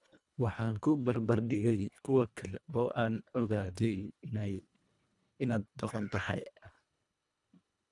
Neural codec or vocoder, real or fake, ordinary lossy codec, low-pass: codec, 24 kHz, 1.5 kbps, HILCodec; fake; none; 10.8 kHz